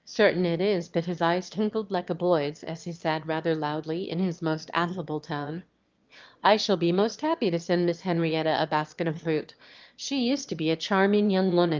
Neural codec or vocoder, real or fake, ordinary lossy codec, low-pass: autoencoder, 22.05 kHz, a latent of 192 numbers a frame, VITS, trained on one speaker; fake; Opus, 32 kbps; 7.2 kHz